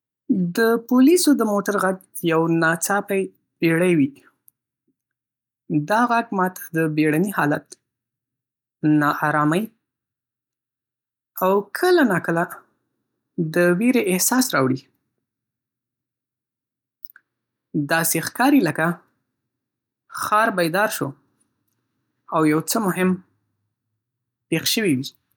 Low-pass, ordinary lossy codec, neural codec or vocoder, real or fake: 19.8 kHz; none; none; real